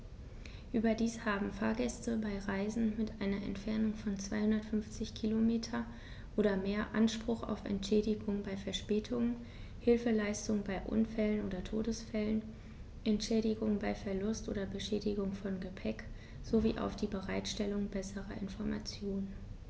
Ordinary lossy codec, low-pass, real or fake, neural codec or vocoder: none; none; real; none